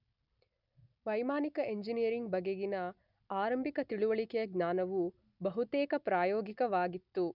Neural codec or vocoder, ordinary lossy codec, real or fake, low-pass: none; none; real; 5.4 kHz